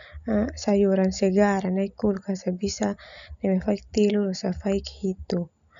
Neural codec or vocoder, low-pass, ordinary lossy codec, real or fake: none; 7.2 kHz; none; real